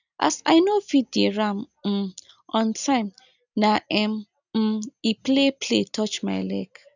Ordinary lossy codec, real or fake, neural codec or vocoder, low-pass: none; real; none; 7.2 kHz